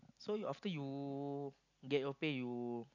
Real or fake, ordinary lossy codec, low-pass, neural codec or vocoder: real; none; 7.2 kHz; none